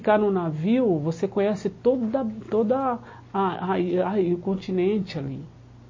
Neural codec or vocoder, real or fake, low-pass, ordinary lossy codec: none; real; 7.2 kHz; MP3, 32 kbps